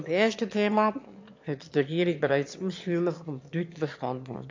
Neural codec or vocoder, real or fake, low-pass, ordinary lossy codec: autoencoder, 22.05 kHz, a latent of 192 numbers a frame, VITS, trained on one speaker; fake; 7.2 kHz; MP3, 48 kbps